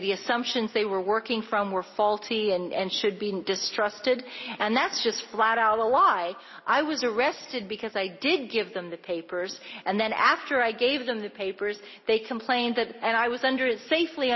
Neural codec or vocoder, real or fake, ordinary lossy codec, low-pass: none; real; MP3, 24 kbps; 7.2 kHz